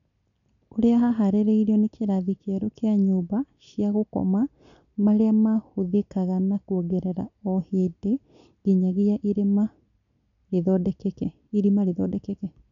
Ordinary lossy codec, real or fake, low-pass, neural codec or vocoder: none; real; 7.2 kHz; none